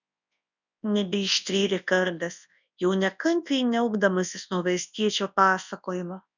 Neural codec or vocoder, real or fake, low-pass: codec, 24 kHz, 0.9 kbps, WavTokenizer, large speech release; fake; 7.2 kHz